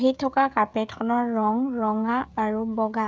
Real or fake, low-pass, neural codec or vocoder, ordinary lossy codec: fake; none; codec, 16 kHz, 16 kbps, FreqCodec, smaller model; none